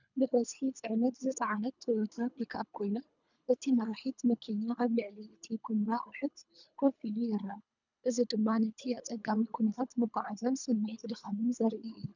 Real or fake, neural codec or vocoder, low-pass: fake; codec, 24 kHz, 3 kbps, HILCodec; 7.2 kHz